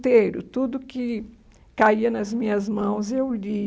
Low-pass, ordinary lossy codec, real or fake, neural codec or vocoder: none; none; real; none